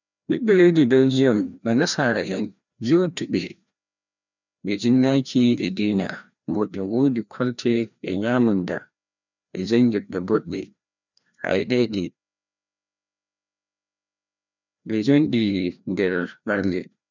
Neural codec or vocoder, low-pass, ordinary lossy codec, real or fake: codec, 16 kHz, 1 kbps, FreqCodec, larger model; 7.2 kHz; none; fake